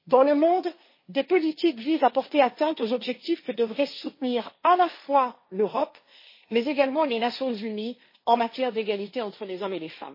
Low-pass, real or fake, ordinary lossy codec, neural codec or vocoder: 5.4 kHz; fake; MP3, 24 kbps; codec, 16 kHz, 1.1 kbps, Voila-Tokenizer